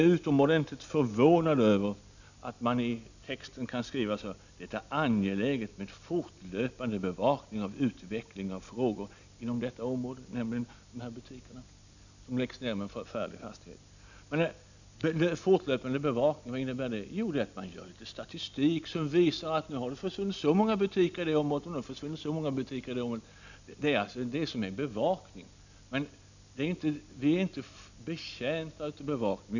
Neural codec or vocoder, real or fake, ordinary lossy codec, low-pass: none; real; none; 7.2 kHz